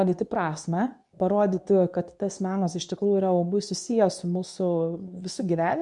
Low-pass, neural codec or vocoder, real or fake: 10.8 kHz; codec, 24 kHz, 0.9 kbps, WavTokenizer, medium speech release version 2; fake